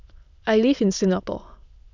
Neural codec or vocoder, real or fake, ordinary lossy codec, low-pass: autoencoder, 22.05 kHz, a latent of 192 numbers a frame, VITS, trained on many speakers; fake; none; 7.2 kHz